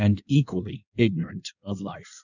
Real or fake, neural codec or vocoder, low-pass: fake; codec, 16 kHz in and 24 kHz out, 1.1 kbps, FireRedTTS-2 codec; 7.2 kHz